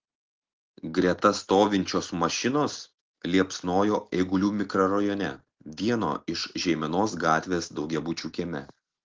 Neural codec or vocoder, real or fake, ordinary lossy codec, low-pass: none; real; Opus, 16 kbps; 7.2 kHz